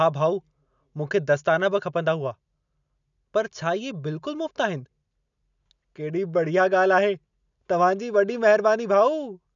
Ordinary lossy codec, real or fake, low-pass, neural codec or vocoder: none; real; 7.2 kHz; none